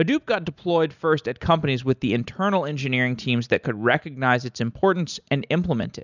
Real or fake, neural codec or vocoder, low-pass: real; none; 7.2 kHz